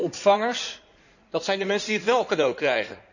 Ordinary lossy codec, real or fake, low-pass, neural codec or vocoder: none; fake; 7.2 kHz; codec, 16 kHz in and 24 kHz out, 2.2 kbps, FireRedTTS-2 codec